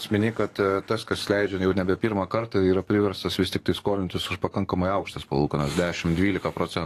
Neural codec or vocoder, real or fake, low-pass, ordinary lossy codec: codec, 44.1 kHz, 7.8 kbps, DAC; fake; 14.4 kHz; AAC, 48 kbps